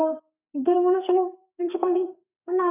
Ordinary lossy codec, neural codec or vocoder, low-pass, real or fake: none; codec, 32 kHz, 1.9 kbps, SNAC; 3.6 kHz; fake